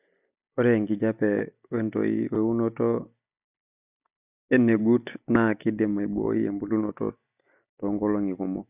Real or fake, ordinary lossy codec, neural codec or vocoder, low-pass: real; none; none; 3.6 kHz